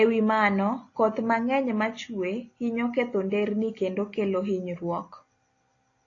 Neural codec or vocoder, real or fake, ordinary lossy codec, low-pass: none; real; AAC, 32 kbps; 7.2 kHz